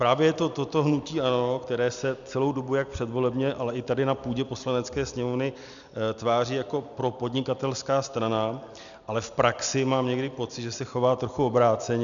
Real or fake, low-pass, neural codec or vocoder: real; 7.2 kHz; none